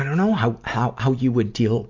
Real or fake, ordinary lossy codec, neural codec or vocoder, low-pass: real; MP3, 48 kbps; none; 7.2 kHz